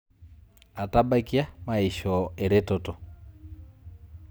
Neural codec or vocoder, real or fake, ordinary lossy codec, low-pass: none; real; none; none